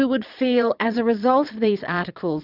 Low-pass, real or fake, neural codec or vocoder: 5.4 kHz; fake; vocoder, 22.05 kHz, 80 mel bands, Vocos